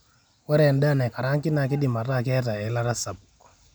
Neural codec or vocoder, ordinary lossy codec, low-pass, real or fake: none; none; none; real